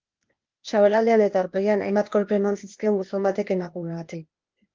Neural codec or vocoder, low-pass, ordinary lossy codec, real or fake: codec, 16 kHz, 0.8 kbps, ZipCodec; 7.2 kHz; Opus, 32 kbps; fake